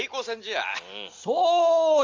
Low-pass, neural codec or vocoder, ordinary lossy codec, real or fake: 7.2 kHz; none; Opus, 32 kbps; real